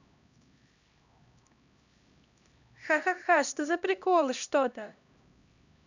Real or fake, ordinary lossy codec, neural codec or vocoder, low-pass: fake; none; codec, 16 kHz, 1 kbps, X-Codec, HuBERT features, trained on LibriSpeech; 7.2 kHz